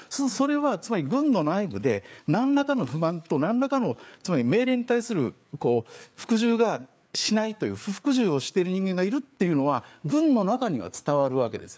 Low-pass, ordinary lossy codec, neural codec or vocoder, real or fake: none; none; codec, 16 kHz, 4 kbps, FreqCodec, larger model; fake